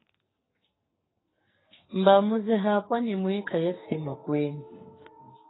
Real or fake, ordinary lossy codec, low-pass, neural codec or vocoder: fake; AAC, 16 kbps; 7.2 kHz; codec, 44.1 kHz, 2.6 kbps, SNAC